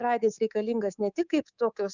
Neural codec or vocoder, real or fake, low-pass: codec, 24 kHz, 3.1 kbps, DualCodec; fake; 7.2 kHz